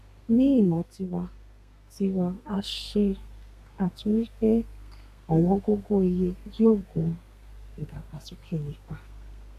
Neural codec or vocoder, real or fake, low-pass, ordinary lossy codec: codec, 32 kHz, 1.9 kbps, SNAC; fake; 14.4 kHz; none